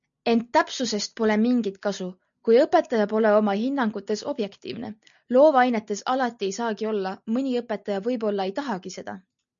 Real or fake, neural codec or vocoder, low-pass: real; none; 7.2 kHz